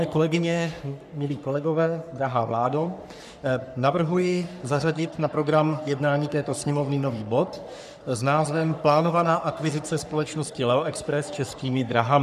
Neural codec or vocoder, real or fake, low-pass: codec, 44.1 kHz, 3.4 kbps, Pupu-Codec; fake; 14.4 kHz